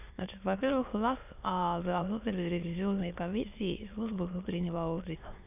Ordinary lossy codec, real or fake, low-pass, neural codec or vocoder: none; fake; 3.6 kHz; autoencoder, 22.05 kHz, a latent of 192 numbers a frame, VITS, trained on many speakers